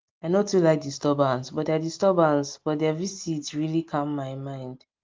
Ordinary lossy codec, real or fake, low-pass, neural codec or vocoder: Opus, 32 kbps; real; 7.2 kHz; none